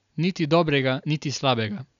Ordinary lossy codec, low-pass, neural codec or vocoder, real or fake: none; 7.2 kHz; none; real